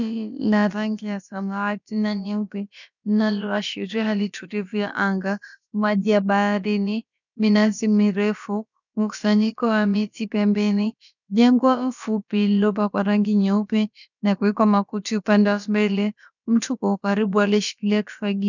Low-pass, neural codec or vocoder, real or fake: 7.2 kHz; codec, 16 kHz, about 1 kbps, DyCAST, with the encoder's durations; fake